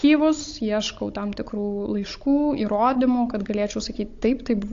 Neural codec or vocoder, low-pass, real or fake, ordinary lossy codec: codec, 16 kHz, 16 kbps, FunCodec, trained on Chinese and English, 50 frames a second; 7.2 kHz; fake; AAC, 48 kbps